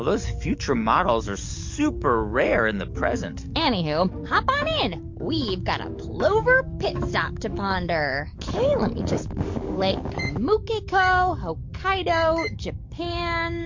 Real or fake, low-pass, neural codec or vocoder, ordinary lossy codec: fake; 7.2 kHz; codec, 44.1 kHz, 7.8 kbps, DAC; MP3, 48 kbps